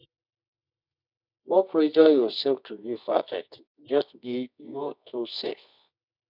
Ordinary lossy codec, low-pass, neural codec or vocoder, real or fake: none; 5.4 kHz; codec, 24 kHz, 0.9 kbps, WavTokenizer, medium music audio release; fake